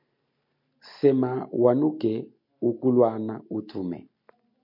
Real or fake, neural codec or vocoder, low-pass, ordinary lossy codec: real; none; 5.4 kHz; MP3, 32 kbps